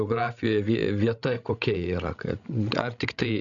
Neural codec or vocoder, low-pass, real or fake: codec, 16 kHz, 16 kbps, FreqCodec, larger model; 7.2 kHz; fake